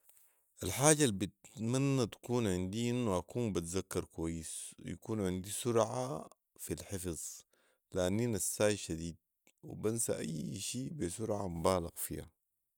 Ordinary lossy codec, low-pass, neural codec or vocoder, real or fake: none; none; none; real